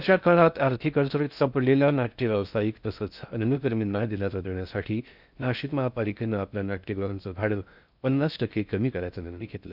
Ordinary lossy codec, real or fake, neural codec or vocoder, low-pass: none; fake; codec, 16 kHz in and 24 kHz out, 0.6 kbps, FocalCodec, streaming, 4096 codes; 5.4 kHz